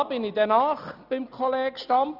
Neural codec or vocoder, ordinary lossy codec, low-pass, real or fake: none; none; 5.4 kHz; real